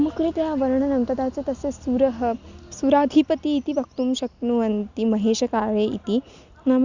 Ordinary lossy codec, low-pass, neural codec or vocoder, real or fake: Opus, 64 kbps; 7.2 kHz; none; real